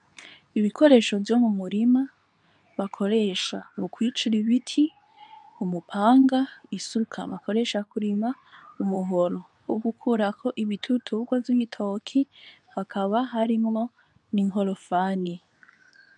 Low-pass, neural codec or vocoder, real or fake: 10.8 kHz; codec, 24 kHz, 0.9 kbps, WavTokenizer, medium speech release version 2; fake